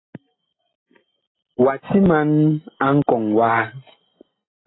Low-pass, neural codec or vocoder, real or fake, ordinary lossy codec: 7.2 kHz; none; real; AAC, 16 kbps